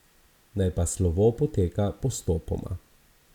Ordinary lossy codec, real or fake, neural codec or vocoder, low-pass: none; real; none; 19.8 kHz